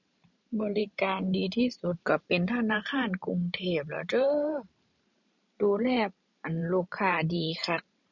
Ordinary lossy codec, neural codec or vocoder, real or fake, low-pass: none; vocoder, 44.1 kHz, 128 mel bands every 512 samples, BigVGAN v2; fake; 7.2 kHz